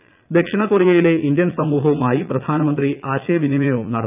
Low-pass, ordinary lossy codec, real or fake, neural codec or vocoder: 3.6 kHz; none; fake; vocoder, 22.05 kHz, 80 mel bands, Vocos